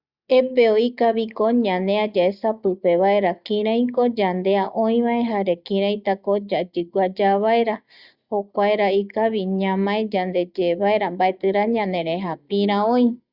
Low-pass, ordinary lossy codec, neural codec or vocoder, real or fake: 5.4 kHz; Opus, 64 kbps; none; real